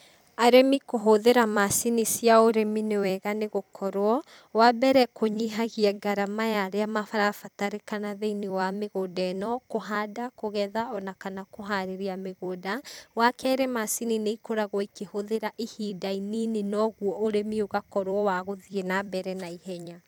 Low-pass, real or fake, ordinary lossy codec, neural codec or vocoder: none; fake; none; vocoder, 44.1 kHz, 128 mel bands every 256 samples, BigVGAN v2